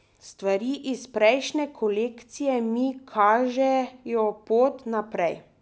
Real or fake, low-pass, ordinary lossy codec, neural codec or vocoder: real; none; none; none